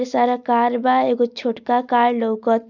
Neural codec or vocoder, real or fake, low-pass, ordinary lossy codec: none; real; 7.2 kHz; none